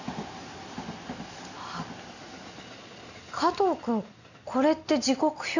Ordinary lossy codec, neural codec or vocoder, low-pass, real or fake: none; none; 7.2 kHz; real